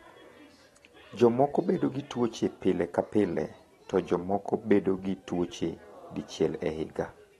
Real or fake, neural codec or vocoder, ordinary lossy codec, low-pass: real; none; AAC, 32 kbps; 19.8 kHz